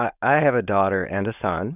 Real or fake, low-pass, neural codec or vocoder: fake; 3.6 kHz; codec, 16 kHz, 4.8 kbps, FACodec